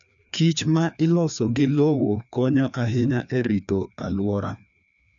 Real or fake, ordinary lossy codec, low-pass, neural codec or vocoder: fake; none; 7.2 kHz; codec, 16 kHz, 2 kbps, FreqCodec, larger model